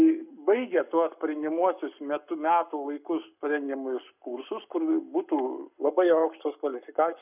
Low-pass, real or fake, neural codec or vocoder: 3.6 kHz; fake; codec, 44.1 kHz, 7.8 kbps, Pupu-Codec